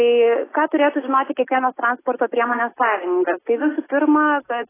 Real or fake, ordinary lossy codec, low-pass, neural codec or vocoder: real; AAC, 16 kbps; 3.6 kHz; none